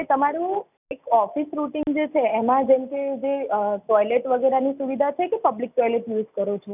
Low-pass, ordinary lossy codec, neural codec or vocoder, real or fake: 3.6 kHz; none; none; real